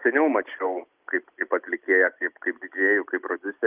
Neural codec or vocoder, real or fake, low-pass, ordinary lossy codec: none; real; 3.6 kHz; Opus, 24 kbps